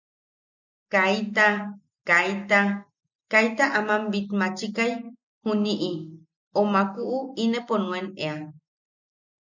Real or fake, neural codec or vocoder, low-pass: real; none; 7.2 kHz